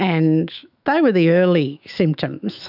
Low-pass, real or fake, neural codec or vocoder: 5.4 kHz; fake; autoencoder, 48 kHz, 128 numbers a frame, DAC-VAE, trained on Japanese speech